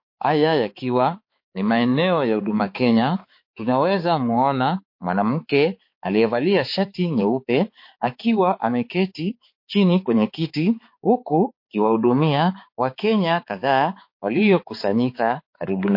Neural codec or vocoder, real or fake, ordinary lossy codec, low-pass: codec, 16 kHz, 4 kbps, X-Codec, HuBERT features, trained on balanced general audio; fake; MP3, 32 kbps; 5.4 kHz